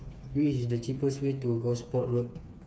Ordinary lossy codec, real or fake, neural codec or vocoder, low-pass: none; fake; codec, 16 kHz, 4 kbps, FreqCodec, smaller model; none